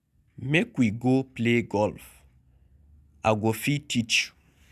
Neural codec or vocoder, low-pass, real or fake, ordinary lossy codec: none; 14.4 kHz; real; none